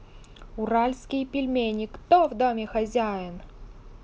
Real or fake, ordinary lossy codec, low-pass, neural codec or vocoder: real; none; none; none